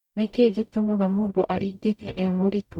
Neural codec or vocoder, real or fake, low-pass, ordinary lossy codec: codec, 44.1 kHz, 0.9 kbps, DAC; fake; 19.8 kHz; MP3, 96 kbps